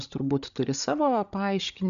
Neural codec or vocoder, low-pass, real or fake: codec, 16 kHz, 8 kbps, FreqCodec, larger model; 7.2 kHz; fake